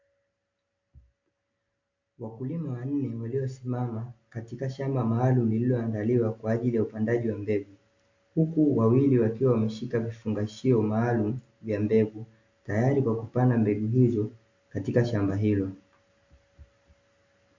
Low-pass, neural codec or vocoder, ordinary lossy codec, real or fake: 7.2 kHz; none; MP3, 48 kbps; real